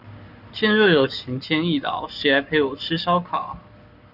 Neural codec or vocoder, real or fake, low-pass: codec, 44.1 kHz, 7.8 kbps, Pupu-Codec; fake; 5.4 kHz